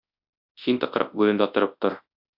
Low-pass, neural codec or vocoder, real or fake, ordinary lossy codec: 5.4 kHz; codec, 24 kHz, 0.9 kbps, WavTokenizer, large speech release; fake; AAC, 48 kbps